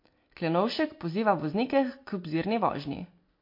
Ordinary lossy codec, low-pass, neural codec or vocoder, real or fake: MP3, 32 kbps; 5.4 kHz; none; real